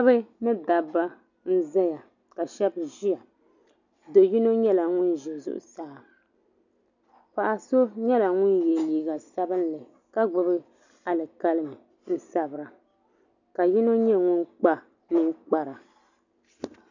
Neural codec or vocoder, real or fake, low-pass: none; real; 7.2 kHz